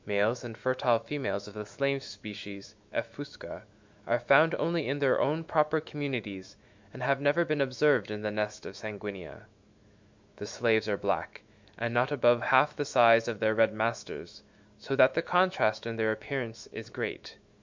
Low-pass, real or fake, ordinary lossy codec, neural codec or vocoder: 7.2 kHz; fake; MP3, 64 kbps; autoencoder, 48 kHz, 128 numbers a frame, DAC-VAE, trained on Japanese speech